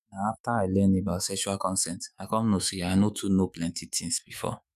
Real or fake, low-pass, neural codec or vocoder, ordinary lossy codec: fake; 14.4 kHz; autoencoder, 48 kHz, 128 numbers a frame, DAC-VAE, trained on Japanese speech; none